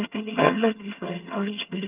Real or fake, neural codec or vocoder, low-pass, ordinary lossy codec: fake; vocoder, 22.05 kHz, 80 mel bands, HiFi-GAN; 3.6 kHz; Opus, 32 kbps